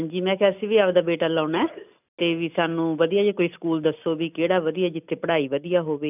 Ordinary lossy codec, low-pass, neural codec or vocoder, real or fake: none; 3.6 kHz; none; real